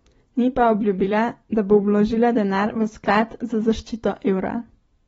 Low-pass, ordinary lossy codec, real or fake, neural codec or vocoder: 19.8 kHz; AAC, 24 kbps; fake; vocoder, 44.1 kHz, 128 mel bands every 256 samples, BigVGAN v2